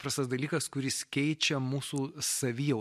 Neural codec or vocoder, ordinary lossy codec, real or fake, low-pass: none; MP3, 64 kbps; real; 14.4 kHz